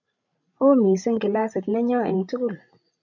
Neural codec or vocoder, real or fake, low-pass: codec, 16 kHz, 16 kbps, FreqCodec, larger model; fake; 7.2 kHz